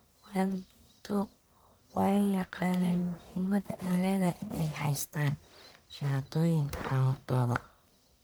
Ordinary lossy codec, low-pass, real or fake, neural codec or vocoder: none; none; fake; codec, 44.1 kHz, 1.7 kbps, Pupu-Codec